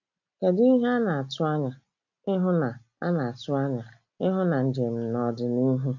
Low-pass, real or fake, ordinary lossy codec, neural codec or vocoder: 7.2 kHz; real; none; none